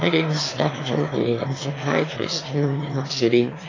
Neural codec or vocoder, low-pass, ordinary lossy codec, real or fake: autoencoder, 22.05 kHz, a latent of 192 numbers a frame, VITS, trained on one speaker; 7.2 kHz; AAC, 32 kbps; fake